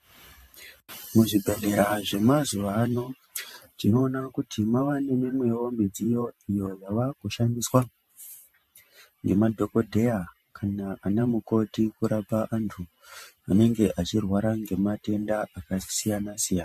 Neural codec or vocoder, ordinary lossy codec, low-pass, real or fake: vocoder, 44.1 kHz, 128 mel bands every 512 samples, BigVGAN v2; MP3, 64 kbps; 14.4 kHz; fake